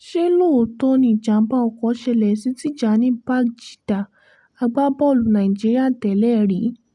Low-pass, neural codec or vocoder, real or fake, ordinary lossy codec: none; none; real; none